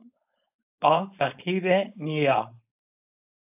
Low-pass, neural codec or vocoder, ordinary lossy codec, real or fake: 3.6 kHz; codec, 16 kHz, 4.8 kbps, FACodec; AAC, 32 kbps; fake